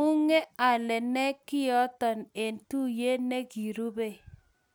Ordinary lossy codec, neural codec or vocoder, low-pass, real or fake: none; none; none; real